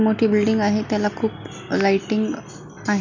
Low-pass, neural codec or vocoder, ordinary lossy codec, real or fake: 7.2 kHz; none; none; real